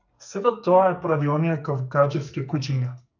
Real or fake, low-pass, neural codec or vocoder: fake; 7.2 kHz; codec, 44.1 kHz, 2.6 kbps, SNAC